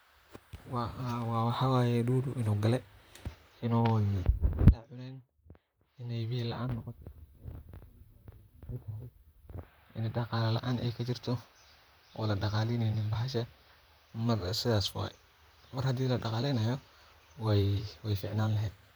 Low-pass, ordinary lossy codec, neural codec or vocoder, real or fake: none; none; vocoder, 44.1 kHz, 128 mel bands, Pupu-Vocoder; fake